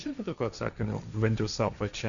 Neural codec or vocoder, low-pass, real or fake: codec, 16 kHz, 1.1 kbps, Voila-Tokenizer; 7.2 kHz; fake